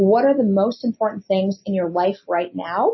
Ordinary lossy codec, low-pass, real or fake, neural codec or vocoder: MP3, 24 kbps; 7.2 kHz; real; none